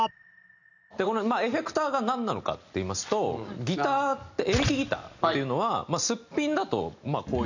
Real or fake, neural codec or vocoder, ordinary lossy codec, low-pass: real; none; none; 7.2 kHz